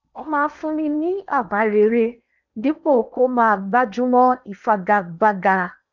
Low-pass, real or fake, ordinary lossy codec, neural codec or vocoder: 7.2 kHz; fake; none; codec, 16 kHz in and 24 kHz out, 0.8 kbps, FocalCodec, streaming, 65536 codes